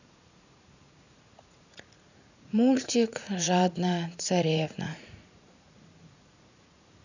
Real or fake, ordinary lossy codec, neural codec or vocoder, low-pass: fake; none; vocoder, 44.1 kHz, 80 mel bands, Vocos; 7.2 kHz